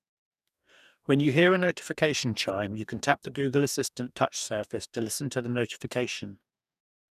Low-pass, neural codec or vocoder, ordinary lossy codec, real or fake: 14.4 kHz; codec, 44.1 kHz, 2.6 kbps, DAC; none; fake